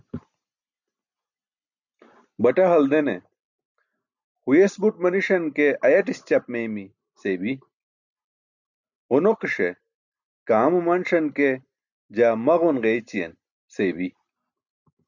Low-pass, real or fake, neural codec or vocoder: 7.2 kHz; real; none